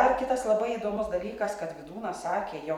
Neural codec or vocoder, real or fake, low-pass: vocoder, 44.1 kHz, 128 mel bands every 256 samples, BigVGAN v2; fake; 19.8 kHz